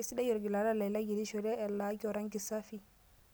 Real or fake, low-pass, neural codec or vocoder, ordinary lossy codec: real; none; none; none